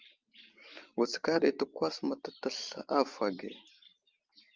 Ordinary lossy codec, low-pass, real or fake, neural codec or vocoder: Opus, 24 kbps; 7.2 kHz; real; none